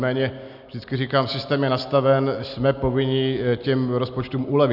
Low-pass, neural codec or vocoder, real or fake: 5.4 kHz; none; real